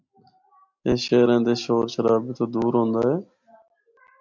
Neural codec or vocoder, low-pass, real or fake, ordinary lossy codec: none; 7.2 kHz; real; MP3, 64 kbps